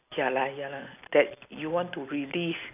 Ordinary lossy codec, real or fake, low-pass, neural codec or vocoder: none; real; 3.6 kHz; none